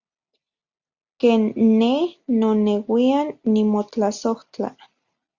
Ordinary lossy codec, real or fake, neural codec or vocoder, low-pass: Opus, 64 kbps; real; none; 7.2 kHz